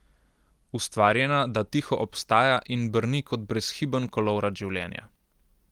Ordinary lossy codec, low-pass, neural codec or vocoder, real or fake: Opus, 24 kbps; 19.8 kHz; none; real